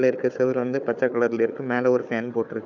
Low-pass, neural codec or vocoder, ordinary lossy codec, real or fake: 7.2 kHz; codec, 16 kHz, 4 kbps, FunCodec, trained on Chinese and English, 50 frames a second; none; fake